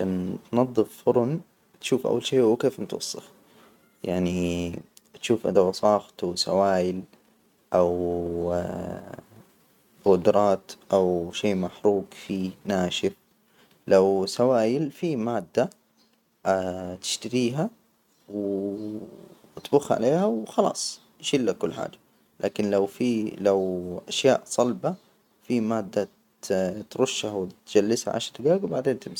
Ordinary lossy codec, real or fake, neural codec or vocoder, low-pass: none; real; none; 19.8 kHz